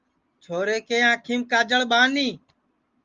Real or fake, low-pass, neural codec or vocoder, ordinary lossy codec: real; 7.2 kHz; none; Opus, 32 kbps